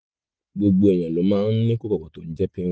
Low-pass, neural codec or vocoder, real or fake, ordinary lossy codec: none; none; real; none